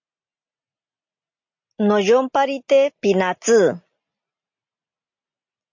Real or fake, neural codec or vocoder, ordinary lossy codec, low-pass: real; none; MP3, 48 kbps; 7.2 kHz